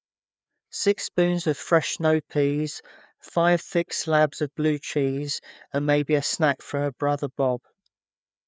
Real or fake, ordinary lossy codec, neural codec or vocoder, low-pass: fake; none; codec, 16 kHz, 4 kbps, FreqCodec, larger model; none